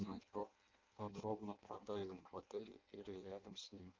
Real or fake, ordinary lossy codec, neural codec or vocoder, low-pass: fake; Opus, 24 kbps; codec, 16 kHz in and 24 kHz out, 0.6 kbps, FireRedTTS-2 codec; 7.2 kHz